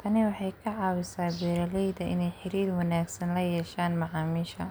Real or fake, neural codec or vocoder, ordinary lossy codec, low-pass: real; none; none; none